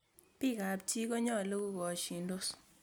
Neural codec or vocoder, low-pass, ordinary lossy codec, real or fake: none; none; none; real